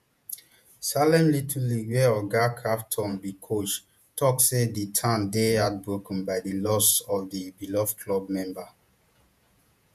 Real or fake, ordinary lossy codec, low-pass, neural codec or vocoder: fake; none; 14.4 kHz; vocoder, 48 kHz, 128 mel bands, Vocos